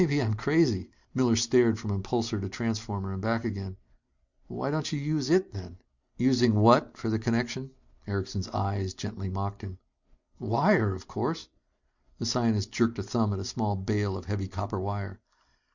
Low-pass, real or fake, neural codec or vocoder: 7.2 kHz; real; none